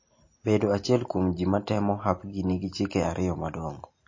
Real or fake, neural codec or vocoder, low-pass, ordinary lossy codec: real; none; 7.2 kHz; MP3, 32 kbps